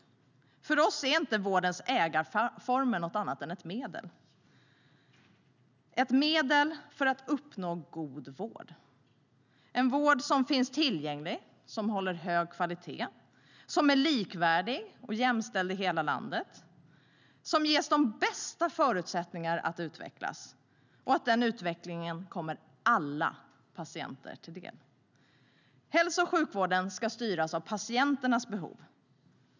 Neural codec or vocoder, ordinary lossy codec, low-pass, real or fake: none; none; 7.2 kHz; real